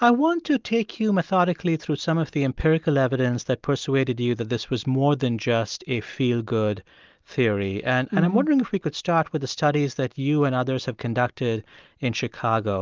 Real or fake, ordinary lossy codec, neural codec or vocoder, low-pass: real; Opus, 24 kbps; none; 7.2 kHz